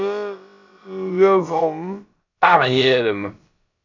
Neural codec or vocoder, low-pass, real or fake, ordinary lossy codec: codec, 16 kHz, about 1 kbps, DyCAST, with the encoder's durations; 7.2 kHz; fake; AAC, 48 kbps